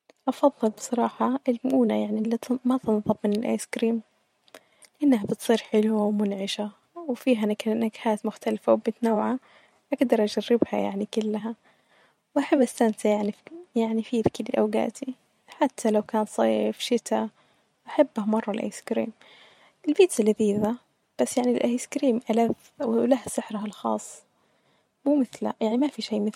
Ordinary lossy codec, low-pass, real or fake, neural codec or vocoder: MP3, 64 kbps; 19.8 kHz; fake; vocoder, 44.1 kHz, 128 mel bands every 512 samples, BigVGAN v2